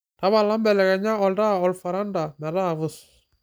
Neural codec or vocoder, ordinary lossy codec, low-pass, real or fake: none; none; none; real